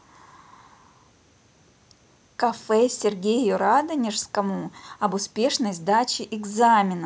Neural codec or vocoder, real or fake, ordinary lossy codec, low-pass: none; real; none; none